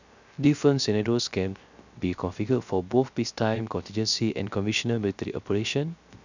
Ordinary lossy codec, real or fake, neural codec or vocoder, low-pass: none; fake; codec, 16 kHz, 0.3 kbps, FocalCodec; 7.2 kHz